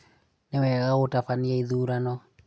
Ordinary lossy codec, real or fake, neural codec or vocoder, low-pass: none; real; none; none